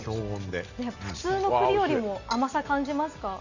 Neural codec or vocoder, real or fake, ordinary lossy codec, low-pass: none; real; none; 7.2 kHz